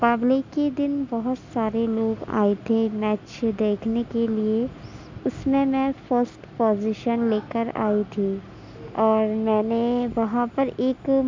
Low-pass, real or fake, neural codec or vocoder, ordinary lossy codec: 7.2 kHz; real; none; MP3, 64 kbps